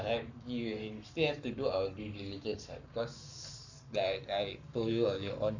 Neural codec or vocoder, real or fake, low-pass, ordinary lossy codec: codec, 44.1 kHz, 7.8 kbps, Pupu-Codec; fake; 7.2 kHz; none